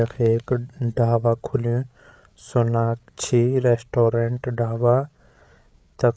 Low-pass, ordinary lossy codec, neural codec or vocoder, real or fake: none; none; codec, 16 kHz, 8 kbps, FreqCodec, larger model; fake